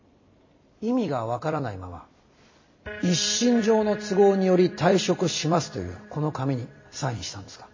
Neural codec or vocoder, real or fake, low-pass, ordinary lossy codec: vocoder, 44.1 kHz, 128 mel bands every 256 samples, BigVGAN v2; fake; 7.2 kHz; MP3, 32 kbps